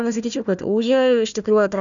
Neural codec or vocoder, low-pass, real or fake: codec, 16 kHz, 1 kbps, FunCodec, trained on Chinese and English, 50 frames a second; 7.2 kHz; fake